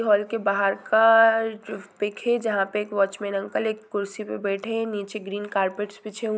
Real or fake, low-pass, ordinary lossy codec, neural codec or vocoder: real; none; none; none